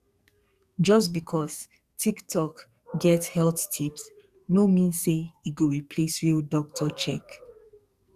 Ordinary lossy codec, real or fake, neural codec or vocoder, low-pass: Opus, 64 kbps; fake; codec, 44.1 kHz, 2.6 kbps, SNAC; 14.4 kHz